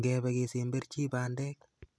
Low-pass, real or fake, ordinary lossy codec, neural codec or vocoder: none; real; none; none